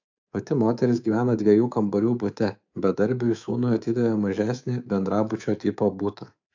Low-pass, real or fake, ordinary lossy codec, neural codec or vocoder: 7.2 kHz; fake; AAC, 48 kbps; codec, 24 kHz, 3.1 kbps, DualCodec